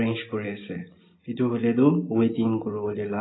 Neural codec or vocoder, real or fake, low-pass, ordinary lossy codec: none; real; 7.2 kHz; AAC, 16 kbps